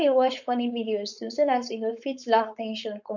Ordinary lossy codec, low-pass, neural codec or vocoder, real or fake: none; 7.2 kHz; codec, 16 kHz, 4.8 kbps, FACodec; fake